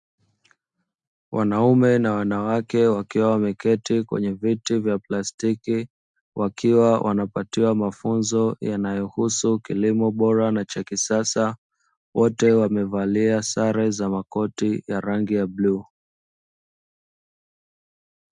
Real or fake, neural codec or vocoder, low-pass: real; none; 10.8 kHz